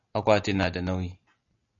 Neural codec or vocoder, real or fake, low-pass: none; real; 7.2 kHz